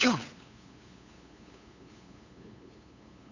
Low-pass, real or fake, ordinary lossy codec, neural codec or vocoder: 7.2 kHz; fake; none; codec, 16 kHz, 8 kbps, FunCodec, trained on LibriTTS, 25 frames a second